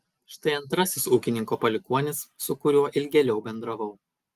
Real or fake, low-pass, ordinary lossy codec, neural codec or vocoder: real; 14.4 kHz; Opus, 32 kbps; none